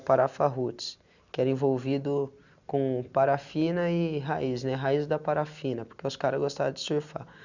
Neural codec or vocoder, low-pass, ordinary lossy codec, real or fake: none; 7.2 kHz; none; real